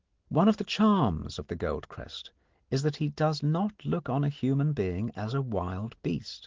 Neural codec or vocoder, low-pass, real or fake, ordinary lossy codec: none; 7.2 kHz; real; Opus, 16 kbps